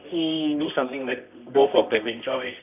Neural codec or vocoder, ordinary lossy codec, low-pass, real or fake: codec, 24 kHz, 0.9 kbps, WavTokenizer, medium music audio release; none; 3.6 kHz; fake